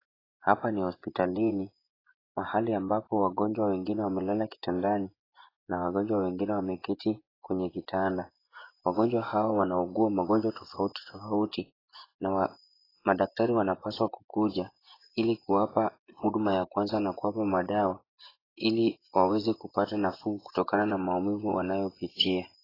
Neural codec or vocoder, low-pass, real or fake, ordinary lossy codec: vocoder, 24 kHz, 100 mel bands, Vocos; 5.4 kHz; fake; AAC, 24 kbps